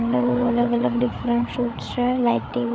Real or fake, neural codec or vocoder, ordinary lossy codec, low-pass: fake; codec, 16 kHz, 8 kbps, FreqCodec, larger model; none; none